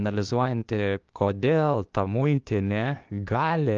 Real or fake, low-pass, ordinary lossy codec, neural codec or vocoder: fake; 7.2 kHz; Opus, 24 kbps; codec, 16 kHz, 0.8 kbps, ZipCodec